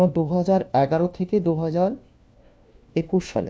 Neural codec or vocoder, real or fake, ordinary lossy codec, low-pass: codec, 16 kHz, 1 kbps, FunCodec, trained on LibriTTS, 50 frames a second; fake; none; none